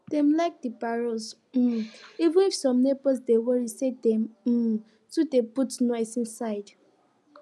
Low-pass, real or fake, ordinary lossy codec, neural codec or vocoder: none; real; none; none